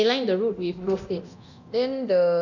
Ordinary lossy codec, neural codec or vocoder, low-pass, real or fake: none; codec, 24 kHz, 0.9 kbps, DualCodec; 7.2 kHz; fake